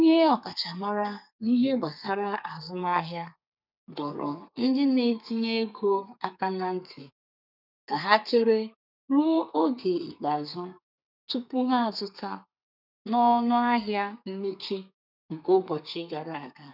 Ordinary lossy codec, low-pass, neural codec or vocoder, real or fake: none; 5.4 kHz; codec, 32 kHz, 1.9 kbps, SNAC; fake